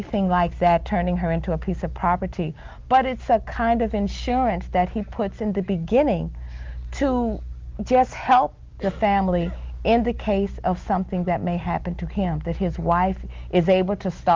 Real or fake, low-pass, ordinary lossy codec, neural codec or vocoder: real; 7.2 kHz; Opus, 32 kbps; none